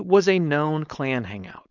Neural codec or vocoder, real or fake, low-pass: codec, 16 kHz, 4.8 kbps, FACodec; fake; 7.2 kHz